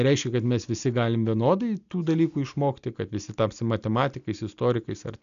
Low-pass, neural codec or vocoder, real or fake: 7.2 kHz; none; real